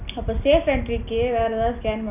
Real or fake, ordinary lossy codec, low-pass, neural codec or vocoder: real; none; 3.6 kHz; none